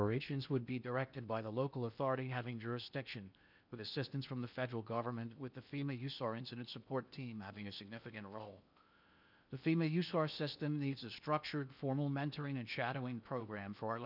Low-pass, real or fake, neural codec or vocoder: 5.4 kHz; fake; codec, 16 kHz in and 24 kHz out, 0.8 kbps, FocalCodec, streaming, 65536 codes